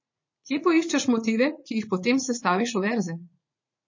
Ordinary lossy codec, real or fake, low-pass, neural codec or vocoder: MP3, 32 kbps; fake; 7.2 kHz; vocoder, 44.1 kHz, 80 mel bands, Vocos